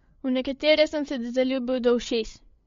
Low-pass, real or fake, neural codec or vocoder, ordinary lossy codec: 7.2 kHz; fake; codec, 16 kHz, 8 kbps, FreqCodec, larger model; MP3, 48 kbps